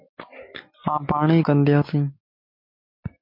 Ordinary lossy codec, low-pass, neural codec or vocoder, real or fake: MP3, 32 kbps; 5.4 kHz; none; real